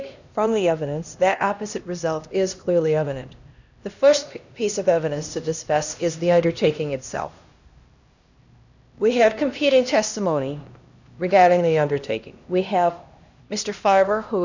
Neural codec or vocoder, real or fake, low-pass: codec, 16 kHz, 1 kbps, X-Codec, HuBERT features, trained on LibriSpeech; fake; 7.2 kHz